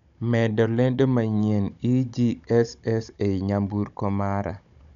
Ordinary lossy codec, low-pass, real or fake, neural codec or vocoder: none; 7.2 kHz; fake; codec, 16 kHz, 16 kbps, FunCodec, trained on Chinese and English, 50 frames a second